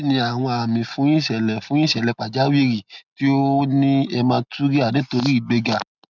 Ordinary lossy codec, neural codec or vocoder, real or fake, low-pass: none; none; real; 7.2 kHz